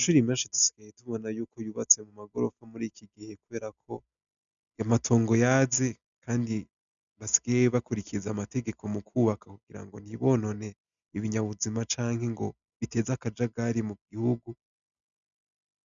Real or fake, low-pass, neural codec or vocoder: real; 7.2 kHz; none